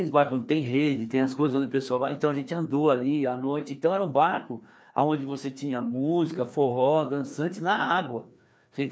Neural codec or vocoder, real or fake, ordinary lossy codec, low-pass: codec, 16 kHz, 2 kbps, FreqCodec, larger model; fake; none; none